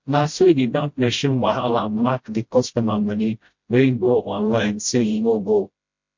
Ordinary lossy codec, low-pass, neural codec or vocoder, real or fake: MP3, 48 kbps; 7.2 kHz; codec, 16 kHz, 0.5 kbps, FreqCodec, smaller model; fake